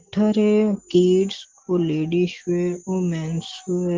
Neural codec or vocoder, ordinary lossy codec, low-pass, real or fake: none; Opus, 16 kbps; 7.2 kHz; real